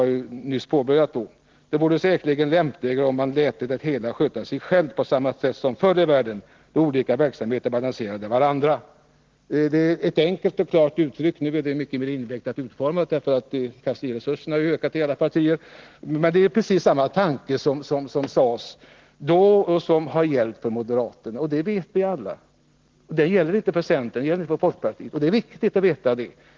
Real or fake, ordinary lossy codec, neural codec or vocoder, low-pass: real; Opus, 16 kbps; none; 7.2 kHz